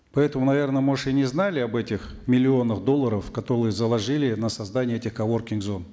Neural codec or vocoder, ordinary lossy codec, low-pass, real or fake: none; none; none; real